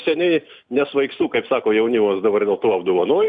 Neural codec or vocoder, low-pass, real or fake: none; 9.9 kHz; real